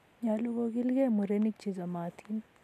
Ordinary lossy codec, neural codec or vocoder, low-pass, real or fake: AAC, 96 kbps; none; 14.4 kHz; real